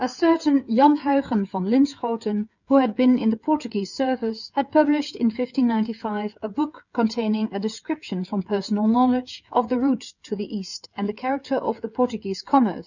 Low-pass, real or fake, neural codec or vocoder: 7.2 kHz; fake; codec, 16 kHz, 16 kbps, FreqCodec, smaller model